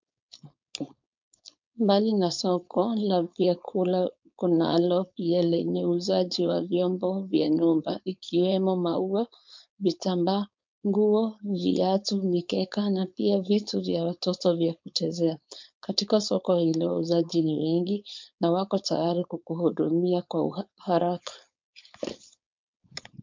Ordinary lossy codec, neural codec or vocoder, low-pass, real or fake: MP3, 64 kbps; codec, 16 kHz, 4.8 kbps, FACodec; 7.2 kHz; fake